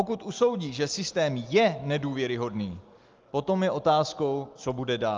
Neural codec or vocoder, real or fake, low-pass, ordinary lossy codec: none; real; 7.2 kHz; Opus, 32 kbps